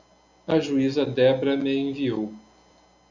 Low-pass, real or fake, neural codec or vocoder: 7.2 kHz; real; none